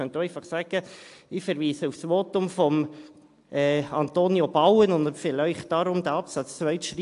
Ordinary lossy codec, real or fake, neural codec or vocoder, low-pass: AAC, 64 kbps; real; none; 10.8 kHz